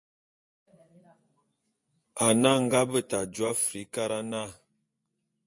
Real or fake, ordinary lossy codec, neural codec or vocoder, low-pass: fake; MP3, 48 kbps; vocoder, 24 kHz, 100 mel bands, Vocos; 10.8 kHz